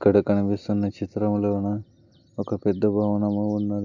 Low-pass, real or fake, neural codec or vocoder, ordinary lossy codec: 7.2 kHz; real; none; none